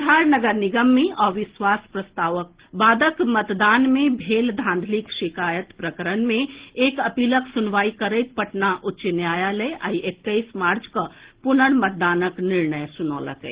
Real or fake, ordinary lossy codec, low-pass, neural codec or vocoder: real; Opus, 16 kbps; 3.6 kHz; none